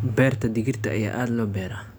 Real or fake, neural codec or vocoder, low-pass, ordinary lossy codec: real; none; none; none